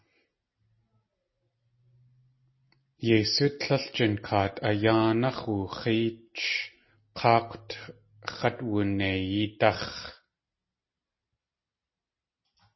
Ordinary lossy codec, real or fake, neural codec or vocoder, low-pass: MP3, 24 kbps; real; none; 7.2 kHz